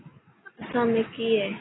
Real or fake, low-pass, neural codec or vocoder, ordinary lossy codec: real; 7.2 kHz; none; AAC, 16 kbps